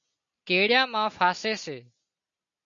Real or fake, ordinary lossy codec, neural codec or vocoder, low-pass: real; MP3, 64 kbps; none; 7.2 kHz